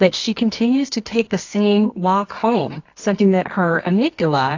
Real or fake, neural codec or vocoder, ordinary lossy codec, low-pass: fake; codec, 24 kHz, 0.9 kbps, WavTokenizer, medium music audio release; AAC, 48 kbps; 7.2 kHz